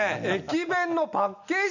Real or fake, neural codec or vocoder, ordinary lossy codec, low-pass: real; none; none; 7.2 kHz